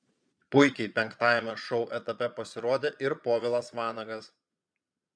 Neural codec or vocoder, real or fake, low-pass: vocoder, 22.05 kHz, 80 mel bands, Vocos; fake; 9.9 kHz